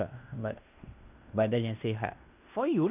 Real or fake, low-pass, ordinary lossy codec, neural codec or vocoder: fake; 3.6 kHz; none; codec, 16 kHz in and 24 kHz out, 0.9 kbps, LongCat-Audio-Codec, fine tuned four codebook decoder